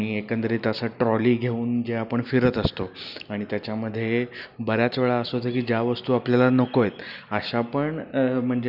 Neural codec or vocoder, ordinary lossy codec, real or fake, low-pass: none; none; real; 5.4 kHz